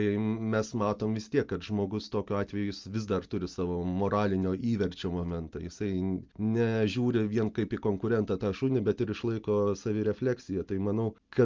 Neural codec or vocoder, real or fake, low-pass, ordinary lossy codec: none; real; 7.2 kHz; Opus, 32 kbps